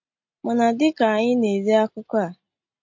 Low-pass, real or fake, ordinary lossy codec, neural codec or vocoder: 7.2 kHz; real; MP3, 48 kbps; none